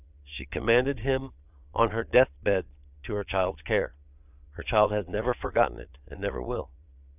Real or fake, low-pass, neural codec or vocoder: fake; 3.6 kHz; vocoder, 22.05 kHz, 80 mel bands, Vocos